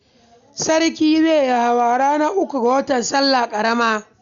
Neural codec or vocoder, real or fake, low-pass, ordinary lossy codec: none; real; 7.2 kHz; none